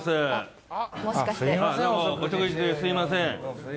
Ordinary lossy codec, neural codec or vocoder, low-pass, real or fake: none; none; none; real